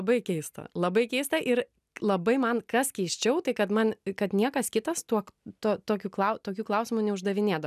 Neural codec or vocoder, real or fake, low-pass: none; real; 14.4 kHz